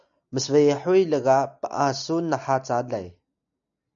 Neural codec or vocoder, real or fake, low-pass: none; real; 7.2 kHz